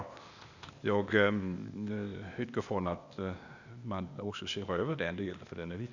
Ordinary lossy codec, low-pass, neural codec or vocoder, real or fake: none; 7.2 kHz; codec, 16 kHz, 0.8 kbps, ZipCodec; fake